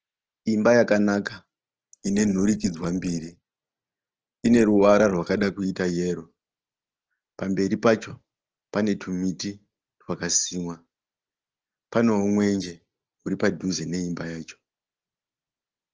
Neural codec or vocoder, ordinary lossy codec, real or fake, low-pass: none; Opus, 16 kbps; real; 7.2 kHz